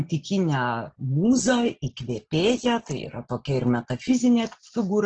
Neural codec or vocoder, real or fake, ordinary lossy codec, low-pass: none; real; Opus, 16 kbps; 7.2 kHz